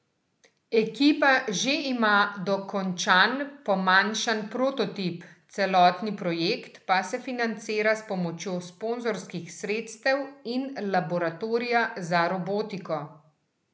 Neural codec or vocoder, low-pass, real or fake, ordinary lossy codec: none; none; real; none